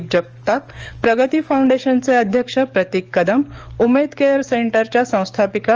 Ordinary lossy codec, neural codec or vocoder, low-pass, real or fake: Opus, 24 kbps; codec, 44.1 kHz, 7.8 kbps, DAC; 7.2 kHz; fake